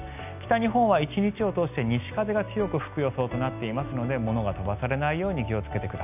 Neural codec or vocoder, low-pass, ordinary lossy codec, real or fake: none; 3.6 kHz; none; real